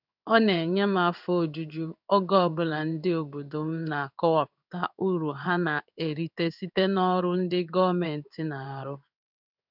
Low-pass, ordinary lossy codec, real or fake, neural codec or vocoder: 5.4 kHz; none; fake; codec, 16 kHz in and 24 kHz out, 1 kbps, XY-Tokenizer